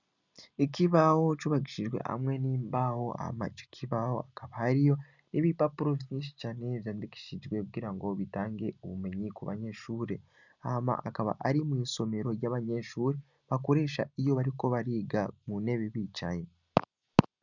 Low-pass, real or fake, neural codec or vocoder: 7.2 kHz; real; none